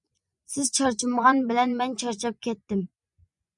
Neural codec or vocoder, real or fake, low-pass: vocoder, 24 kHz, 100 mel bands, Vocos; fake; 10.8 kHz